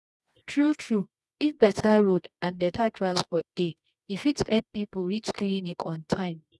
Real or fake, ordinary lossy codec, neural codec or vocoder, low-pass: fake; none; codec, 24 kHz, 0.9 kbps, WavTokenizer, medium music audio release; none